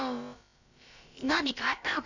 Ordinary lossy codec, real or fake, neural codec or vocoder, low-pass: none; fake; codec, 16 kHz, about 1 kbps, DyCAST, with the encoder's durations; 7.2 kHz